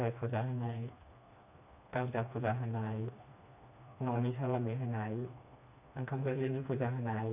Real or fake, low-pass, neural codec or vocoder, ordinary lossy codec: fake; 3.6 kHz; codec, 16 kHz, 2 kbps, FreqCodec, smaller model; AAC, 32 kbps